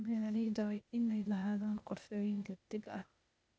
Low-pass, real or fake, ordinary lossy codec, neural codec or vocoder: none; fake; none; codec, 16 kHz, 0.8 kbps, ZipCodec